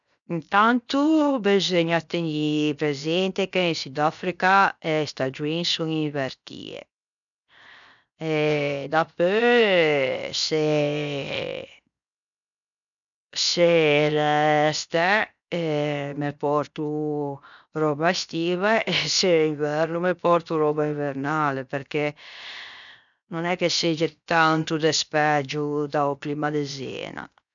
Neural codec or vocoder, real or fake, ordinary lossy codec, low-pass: codec, 16 kHz, 0.7 kbps, FocalCodec; fake; none; 7.2 kHz